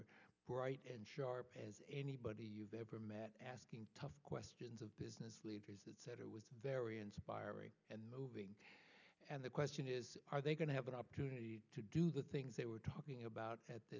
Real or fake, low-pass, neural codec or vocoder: real; 7.2 kHz; none